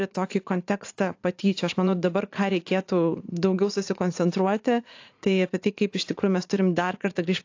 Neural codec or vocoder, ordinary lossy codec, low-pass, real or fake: vocoder, 44.1 kHz, 80 mel bands, Vocos; AAC, 48 kbps; 7.2 kHz; fake